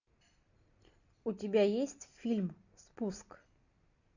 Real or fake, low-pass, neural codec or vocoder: real; 7.2 kHz; none